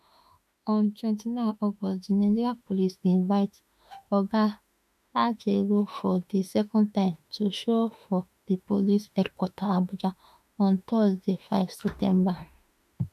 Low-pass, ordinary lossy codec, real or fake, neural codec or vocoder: 14.4 kHz; none; fake; autoencoder, 48 kHz, 32 numbers a frame, DAC-VAE, trained on Japanese speech